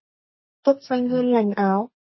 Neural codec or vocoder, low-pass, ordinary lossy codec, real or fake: codec, 32 kHz, 1.9 kbps, SNAC; 7.2 kHz; MP3, 24 kbps; fake